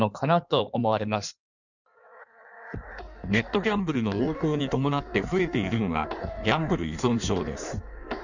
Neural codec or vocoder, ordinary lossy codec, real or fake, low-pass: codec, 16 kHz in and 24 kHz out, 1.1 kbps, FireRedTTS-2 codec; none; fake; 7.2 kHz